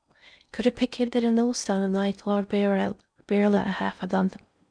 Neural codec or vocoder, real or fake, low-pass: codec, 16 kHz in and 24 kHz out, 0.6 kbps, FocalCodec, streaming, 4096 codes; fake; 9.9 kHz